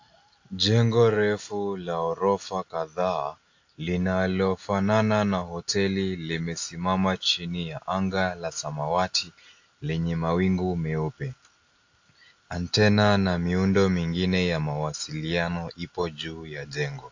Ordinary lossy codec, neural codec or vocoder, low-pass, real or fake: AAC, 48 kbps; none; 7.2 kHz; real